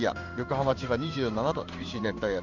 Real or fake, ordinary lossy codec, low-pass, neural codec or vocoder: fake; none; 7.2 kHz; codec, 16 kHz in and 24 kHz out, 1 kbps, XY-Tokenizer